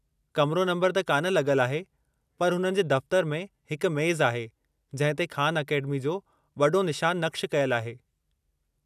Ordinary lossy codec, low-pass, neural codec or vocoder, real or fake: none; 14.4 kHz; none; real